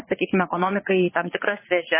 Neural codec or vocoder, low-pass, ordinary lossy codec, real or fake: vocoder, 22.05 kHz, 80 mel bands, Vocos; 3.6 kHz; MP3, 16 kbps; fake